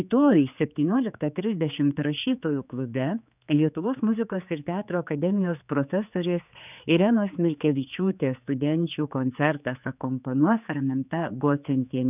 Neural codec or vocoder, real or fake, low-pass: codec, 16 kHz, 4 kbps, X-Codec, HuBERT features, trained on general audio; fake; 3.6 kHz